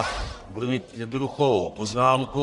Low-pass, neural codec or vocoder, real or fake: 10.8 kHz; codec, 44.1 kHz, 1.7 kbps, Pupu-Codec; fake